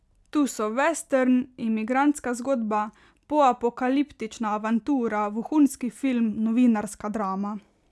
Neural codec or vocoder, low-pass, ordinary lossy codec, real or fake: none; none; none; real